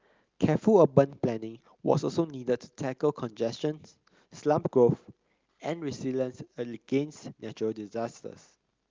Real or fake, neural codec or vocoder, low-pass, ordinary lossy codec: real; none; 7.2 kHz; Opus, 32 kbps